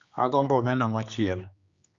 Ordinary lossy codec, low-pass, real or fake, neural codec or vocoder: Opus, 64 kbps; 7.2 kHz; fake; codec, 16 kHz, 2 kbps, X-Codec, HuBERT features, trained on general audio